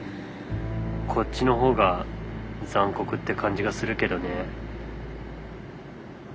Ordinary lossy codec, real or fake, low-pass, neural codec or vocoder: none; real; none; none